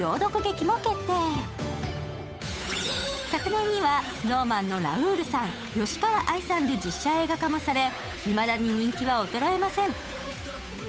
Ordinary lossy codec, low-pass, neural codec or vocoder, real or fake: none; none; codec, 16 kHz, 8 kbps, FunCodec, trained on Chinese and English, 25 frames a second; fake